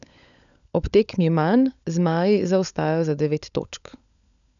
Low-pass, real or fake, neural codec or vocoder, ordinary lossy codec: 7.2 kHz; fake; codec, 16 kHz, 16 kbps, FunCodec, trained on LibriTTS, 50 frames a second; none